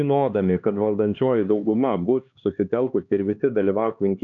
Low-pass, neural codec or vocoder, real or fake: 7.2 kHz; codec, 16 kHz, 2 kbps, X-Codec, HuBERT features, trained on LibriSpeech; fake